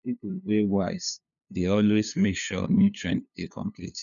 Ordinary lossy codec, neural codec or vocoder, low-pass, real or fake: none; codec, 16 kHz, 2 kbps, FunCodec, trained on LibriTTS, 25 frames a second; 7.2 kHz; fake